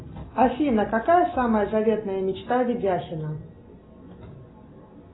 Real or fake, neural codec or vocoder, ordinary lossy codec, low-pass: real; none; AAC, 16 kbps; 7.2 kHz